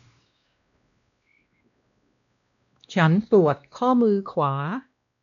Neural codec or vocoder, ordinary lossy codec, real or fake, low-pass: codec, 16 kHz, 1 kbps, X-Codec, WavLM features, trained on Multilingual LibriSpeech; MP3, 96 kbps; fake; 7.2 kHz